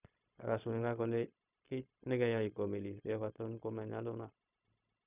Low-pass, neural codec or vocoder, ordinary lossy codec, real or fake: 3.6 kHz; codec, 16 kHz, 0.4 kbps, LongCat-Audio-Codec; none; fake